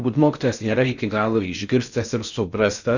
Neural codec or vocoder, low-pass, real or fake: codec, 16 kHz in and 24 kHz out, 0.6 kbps, FocalCodec, streaming, 4096 codes; 7.2 kHz; fake